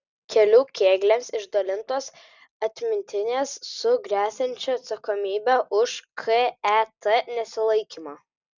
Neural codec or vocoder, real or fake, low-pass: none; real; 7.2 kHz